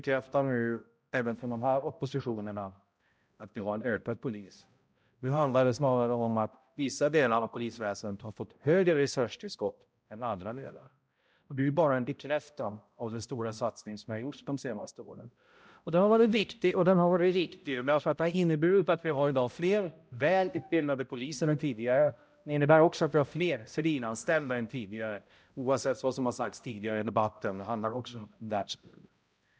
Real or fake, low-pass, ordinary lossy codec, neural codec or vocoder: fake; none; none; codec, 16 kHz, 0.5 kbps, X-Codec, HuBERT features, trained on balanced general audio